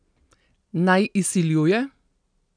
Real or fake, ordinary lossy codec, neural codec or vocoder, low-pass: real; none; none; 9.9 kHz